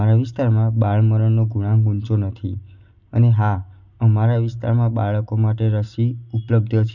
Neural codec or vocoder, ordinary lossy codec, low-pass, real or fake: none; none; 7.2 kHz; real